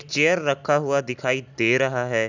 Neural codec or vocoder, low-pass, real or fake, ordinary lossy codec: none; 7.2 kHz; real; none